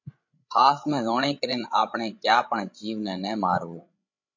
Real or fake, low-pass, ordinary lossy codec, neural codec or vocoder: fake; 7.2 kHz; MP3, 48 kbps; codec, 16 kHz, 16 kbps, FreqCodec, larger model